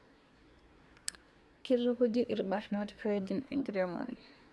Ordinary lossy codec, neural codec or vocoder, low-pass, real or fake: none; codec, 24 kHz, 1 kbps, SNAC; none; fake